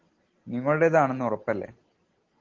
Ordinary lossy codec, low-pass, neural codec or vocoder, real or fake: Opus, 16 kbps; 7.2 kHz; none; real